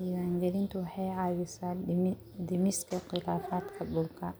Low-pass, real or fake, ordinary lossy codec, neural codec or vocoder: none; real; none; none